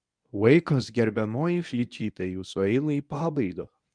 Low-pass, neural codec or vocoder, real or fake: 9.9 kHz; codec, 24 kHz, 0.9 kbps, WavTokenizer, medium speech release version 1; fake